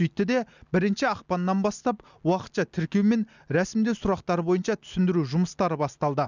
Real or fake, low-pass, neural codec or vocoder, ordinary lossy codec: real; 7.2 kHz; none; none